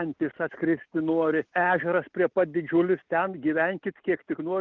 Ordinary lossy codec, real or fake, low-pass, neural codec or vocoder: Opus, 32 kbps; real; 7.2 kHz; none